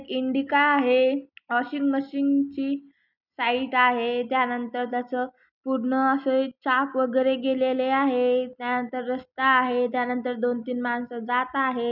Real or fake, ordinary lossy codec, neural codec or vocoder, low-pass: real; none; none; 5.4 kHz